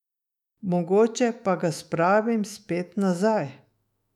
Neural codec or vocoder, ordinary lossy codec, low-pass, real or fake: autoencoder, 48 kHz, 128 numbers a frame, DAC-VAE, trained on Japanese speech; none; 19.8 kHz; fake